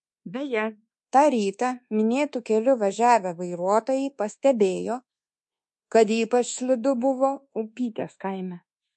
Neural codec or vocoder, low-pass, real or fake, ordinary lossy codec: codec, 24 kHz, 1.2 kbps, DualCodec; 10.8 kHz; fake; MP3, 48 kbps